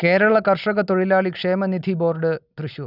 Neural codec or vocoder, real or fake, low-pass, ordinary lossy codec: none; real; 5.4 kHz; none